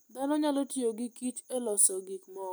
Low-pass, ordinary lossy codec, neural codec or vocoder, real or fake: none; none; none; real